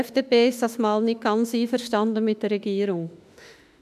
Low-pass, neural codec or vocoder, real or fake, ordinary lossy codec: 14.4 kHz; autoencoder, 48 kHz, 32 numbers a frame, DAC-VAE, trained on Japanese speech; fake; none